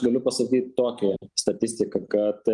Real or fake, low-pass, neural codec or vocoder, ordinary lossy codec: real; 10.8 kHz; none; Opus, 32 kbps